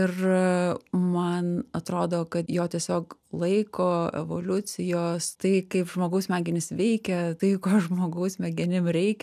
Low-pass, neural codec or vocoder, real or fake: 14.4 kHz; none; real